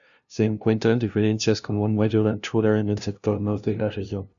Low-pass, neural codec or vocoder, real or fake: 7.2 kHz; codec, 16 kHz, 0.5 kbps, FunCodec, trained on LibriTTS, 25 frames a second; fake